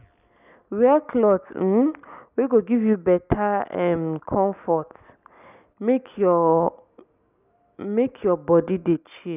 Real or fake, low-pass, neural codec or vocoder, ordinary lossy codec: real; 3.6 kHz; none; none